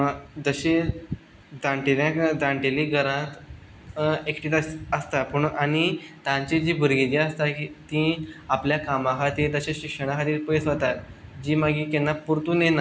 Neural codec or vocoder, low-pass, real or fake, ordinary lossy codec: none; none; real; none